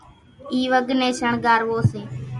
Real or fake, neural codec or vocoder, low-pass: real; none; 10.8 kHz